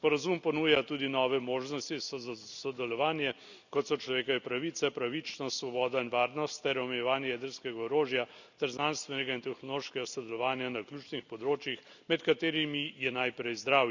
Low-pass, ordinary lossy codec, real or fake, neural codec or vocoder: 7.2 kHz; none; real; none